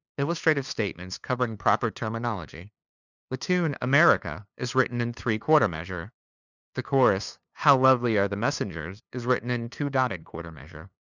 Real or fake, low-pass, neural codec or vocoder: fake; 7.2 kHz; codec, 16 kHz, 2 kbps, FunCodec, trained on LibriTTS, 25 frames a second